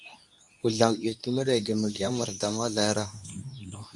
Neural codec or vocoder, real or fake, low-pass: codec, 24 kHz, 0.9 kbps, WavTokenizer, medium speech release version 2; fake; 10.8 kHz